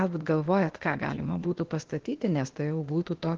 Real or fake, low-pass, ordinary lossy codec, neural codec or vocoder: fake; 7.2 kHz; Opus, 16 kbps; codec, 16 kHz, 0.8 kbps, ZipCodec